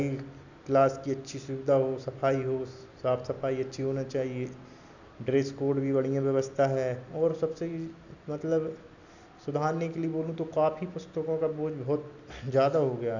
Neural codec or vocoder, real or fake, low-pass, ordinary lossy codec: none; real; 7.2 kHz; none